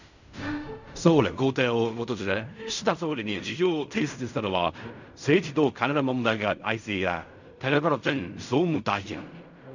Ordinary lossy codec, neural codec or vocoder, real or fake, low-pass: none; codec, 16 kHz in and 24 kHz out, 0.4 kbps, LongCat-Audio-Codec, fine tuned four codebook decoder; fake; 7.2 kHz